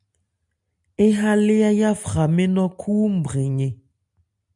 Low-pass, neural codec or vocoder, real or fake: 10.8 kHz; none; real